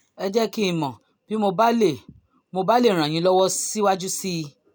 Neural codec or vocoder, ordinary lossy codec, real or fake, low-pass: none; none; real; none